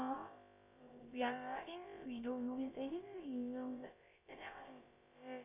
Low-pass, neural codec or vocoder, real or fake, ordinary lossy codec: 3.6 kHz; codec, 16 kHz, about 1 kbps, DyCAST, with the encoder's durations; fake; none